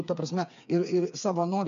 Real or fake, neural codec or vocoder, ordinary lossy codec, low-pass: fake; codec, 16 kHz, 4 kbps, FreqCodec, smaller model; MP3, 64 kbps; 7.2 kHz